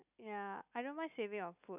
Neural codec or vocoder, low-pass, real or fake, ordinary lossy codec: none; 3.6 kHz; real; none